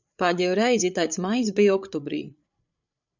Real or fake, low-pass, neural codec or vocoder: fake; 7.2 kHz; codec, 16 kHz, 8 kbps, FreqCodec, larger model